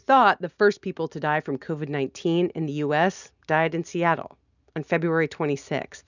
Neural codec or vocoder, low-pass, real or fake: none; 7.2 kHz; real